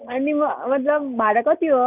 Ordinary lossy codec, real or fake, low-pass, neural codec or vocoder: none; real; 3.6 kHz; none